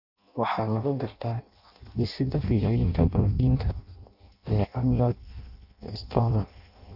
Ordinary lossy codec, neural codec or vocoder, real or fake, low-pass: none; codec, 16 kHz in and 24 kHz out, 0.6 kbps, FireRedTTS-2 codec; fake; 5.4 kHz